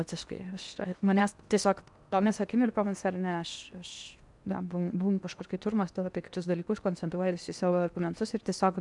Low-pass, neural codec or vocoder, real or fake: 10.8 kHz; codec, 16 kHz in and 24 kHz out, 0.8 kbps, FocalCodec, streaming, 65536 codes; fake